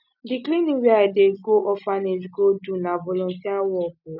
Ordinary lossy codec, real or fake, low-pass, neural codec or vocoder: none; real; 5.4 kHz; none